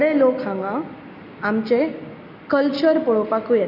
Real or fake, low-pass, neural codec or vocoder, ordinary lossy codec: real; 5.4 kHz; none; none